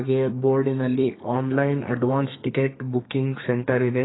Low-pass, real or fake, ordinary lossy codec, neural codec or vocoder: 7.2 kHz; fake; AAC, 16 kbps; codec, 32 kHz, 1.9 kbps, SNAC